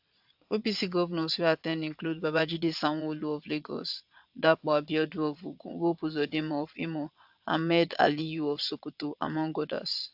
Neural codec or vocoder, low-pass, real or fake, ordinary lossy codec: vocoder, 22.05 kHz, 80 mel bands, Vocos; 5.4 kHz; fake; AAC, 48 kbps